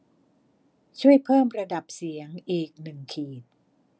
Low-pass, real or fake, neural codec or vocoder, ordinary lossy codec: none; real; none; none